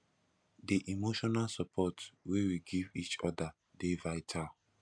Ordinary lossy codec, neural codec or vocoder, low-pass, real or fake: none; none; 9.9 kHz; real